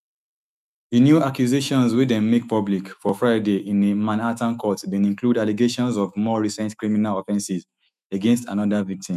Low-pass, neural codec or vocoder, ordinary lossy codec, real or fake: 14.4 kHz; autoencoder, 48 kHz, 128 numbers a frame, DAC-VAE, trained on Japanese speech; none; fake